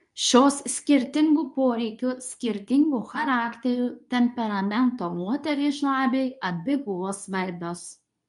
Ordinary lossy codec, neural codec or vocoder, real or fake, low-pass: MP3, 96 kbps; codec, 24 kHz, 0.9 kbps, WavTokenizer, medium speech release version 2; fake; 10.8 kHz